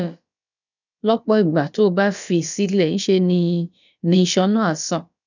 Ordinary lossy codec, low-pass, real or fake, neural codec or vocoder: none; 7.2 kHz; fake; codec, 16 kHz, about 1 kbps, DyCAST, with the encoder's durations